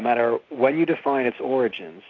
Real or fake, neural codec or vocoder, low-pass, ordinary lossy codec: real; none; 7.2 kHz; AAC, 32 kbps